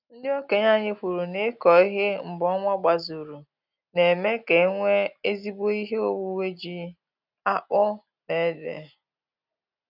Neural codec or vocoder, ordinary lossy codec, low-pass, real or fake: none; none; 5.4 kHz; real